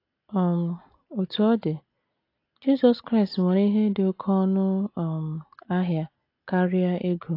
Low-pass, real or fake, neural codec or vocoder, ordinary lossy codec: 5.4 kHz; real; none; AAC, 32 kbps